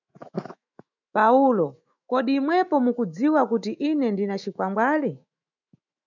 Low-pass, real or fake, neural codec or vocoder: 7.2 kHz; fake; autoencoder, 48 kHz, 128 numbers a frame, DAC-VAE, trained on Japanese speech